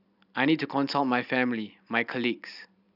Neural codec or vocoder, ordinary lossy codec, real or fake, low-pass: none; none; real; 5.4 kHz